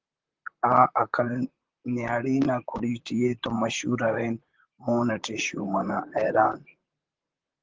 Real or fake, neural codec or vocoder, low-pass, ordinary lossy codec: fake; vocoder, 44.1 kHz, 128 mel bands, Pupu-Vocoder; 7.2 kHz; Opus, 16 kbps